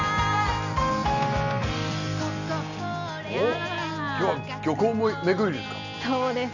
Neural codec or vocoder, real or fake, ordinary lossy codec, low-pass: none; real; none; 7.2 kHz